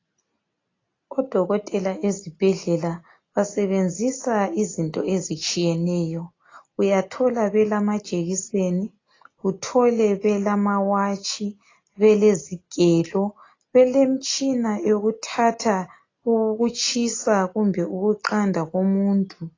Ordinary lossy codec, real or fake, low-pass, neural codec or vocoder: AAC, 32 kbps; real; 7.2 kHz; none